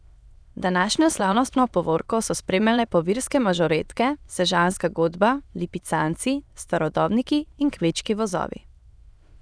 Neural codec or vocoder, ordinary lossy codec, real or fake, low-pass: autoencoder, 22.05 kHz, a latent of 192 numbers a frame, VITS, trained on many speakers; none; fake; none